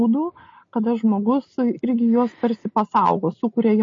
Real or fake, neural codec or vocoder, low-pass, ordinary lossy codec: real; none; 7.2 kHz; MP3, 32 kbps